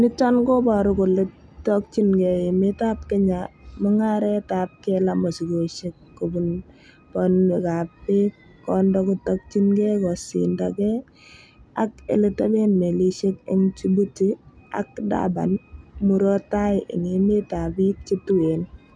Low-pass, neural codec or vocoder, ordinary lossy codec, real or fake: none; none; none; real